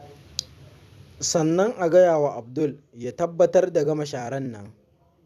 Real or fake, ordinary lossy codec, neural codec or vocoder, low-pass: fake; none; vocoder, 44.1 kHz, 128 mel bands every 256 samples, BigVGAN v2; 14.4 kHz